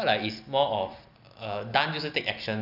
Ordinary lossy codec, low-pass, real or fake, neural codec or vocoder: none; 5.4 kHz; real; none